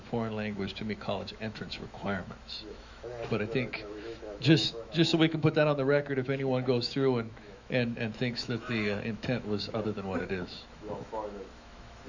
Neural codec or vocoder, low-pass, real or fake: autoencoder, 48 kHz, 128 numbers a frame, DAC-VAE, trained on Japanese speech; 7.2 kHz; fake